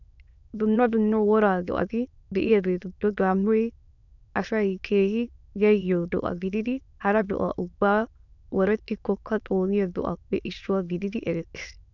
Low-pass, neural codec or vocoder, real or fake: 7.2 kHz; autoencoder, 22.05 kHz, a latent of 192 numbers a frame, VITS, trained on many speakers; fake